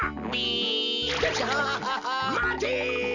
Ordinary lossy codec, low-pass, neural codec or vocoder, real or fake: none; 7.2 kHz; none; real